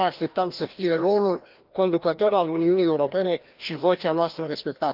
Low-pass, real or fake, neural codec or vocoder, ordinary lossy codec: 5.4 kHz; fake; codec, 16 kHz, 1 kbps, FreqCodec, larger model; Opus, 32 kbps